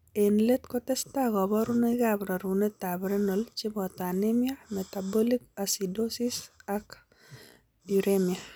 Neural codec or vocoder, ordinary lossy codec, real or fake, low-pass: none; none; real; none